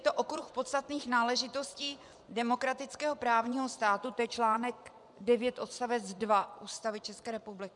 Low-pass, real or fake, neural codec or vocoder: 10.8 kHz; fake; vocoder, 24 kHz, 100 mel bands, Vocos